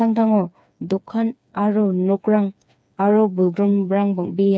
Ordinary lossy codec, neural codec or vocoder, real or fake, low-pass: none; codec, 16 kHz, 4 kbps, FreqCodec, smaller model; fake; none